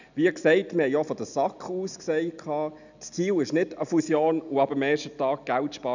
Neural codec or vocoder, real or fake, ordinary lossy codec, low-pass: none; real; none; 7.2 kHz